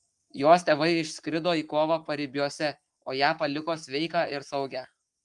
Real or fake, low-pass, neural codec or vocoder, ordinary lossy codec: fake; 10.8 kHz; codec, 44.1 kHz, 7.8 kbps, Pupu-Codec; Opus, 32 kbps